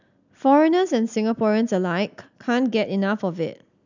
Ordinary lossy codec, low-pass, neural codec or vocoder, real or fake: none; 7.2 kHz; none; real